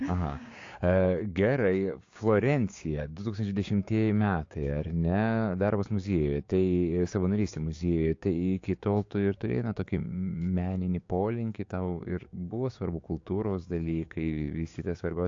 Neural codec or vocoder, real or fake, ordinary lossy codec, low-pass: codec, 16 kHz, 6 kbps, DAC; fake; AAC, 48 kbps; 7.2 kHz